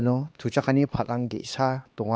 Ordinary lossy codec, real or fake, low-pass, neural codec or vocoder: none; fake; none; codec, 16 kHz, 2 kbps, X-Codec, HuBERT features, trained on LibriSpeech